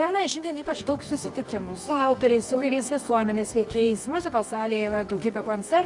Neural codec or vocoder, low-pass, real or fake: codec, 24 kHz, 0.9 kbps, WavTokenizer, medium music audio release; 10.8 kHz; fake